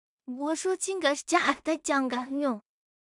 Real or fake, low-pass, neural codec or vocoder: fake; 10.8 kHz; codec, 16 kHz in and 24 kHz out, 0.4 kbps, LongCat-Audio-Codec, two codebook decoder